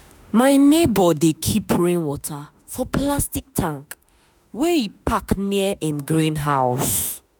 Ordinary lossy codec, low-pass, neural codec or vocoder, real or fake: none; none; autoencoder, 48 kHz, 32 numbers a frame, DAC-VAE, trained on Japanese speech; fake